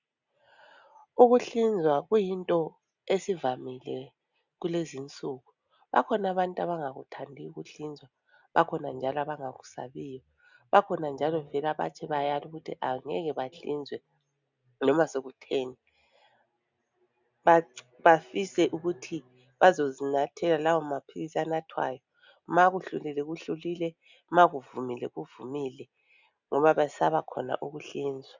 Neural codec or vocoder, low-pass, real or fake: none; 7.2 kHz; real